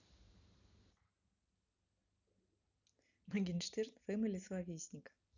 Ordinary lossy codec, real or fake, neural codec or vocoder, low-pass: none; real; none; 7.2 kHz